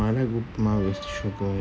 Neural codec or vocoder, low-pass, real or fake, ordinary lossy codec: none; none; real; none